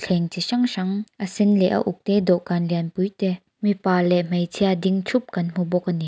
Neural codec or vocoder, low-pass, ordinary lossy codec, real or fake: none; none; none; real